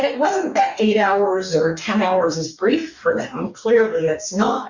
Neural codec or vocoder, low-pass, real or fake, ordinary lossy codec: codec, 44.1 kHz, 2.6 kbps, DAC; 7.2 kHz; fake; Opus, 64 kbps